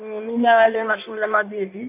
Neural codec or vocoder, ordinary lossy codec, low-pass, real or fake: codec, 16 kHz in and 24 kHz out, 1.1 kbps, FireRedTTS-2 codec; MP3, 32 kbps; 3.6 kHz; fake